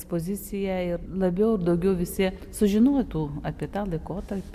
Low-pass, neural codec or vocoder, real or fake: 14.4 kHz; none; real